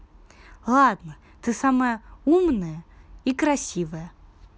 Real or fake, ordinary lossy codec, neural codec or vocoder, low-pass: real; none; none; none